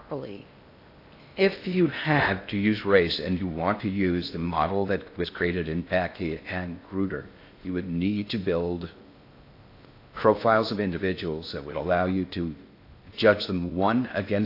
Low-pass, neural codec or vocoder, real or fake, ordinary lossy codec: 5.4 kHz; codec, 16 kHz in and 24 kHz out, 0.6 kbps, FocalCodec, streaming, 4096 codes; fake; AAC, 32 kbps